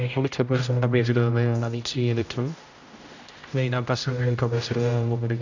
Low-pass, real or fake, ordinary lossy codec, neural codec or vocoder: 7.2 kHz; fake; none; codec, 16 kHz, 0.5 kbps, X-Codec, HuBERT features, trained on general audio